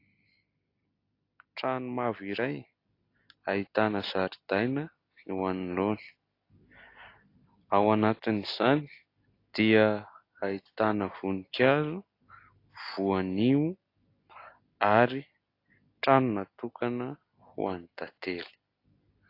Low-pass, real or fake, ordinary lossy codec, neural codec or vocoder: 5.4 kHz; real; AAC, 32 kbps; none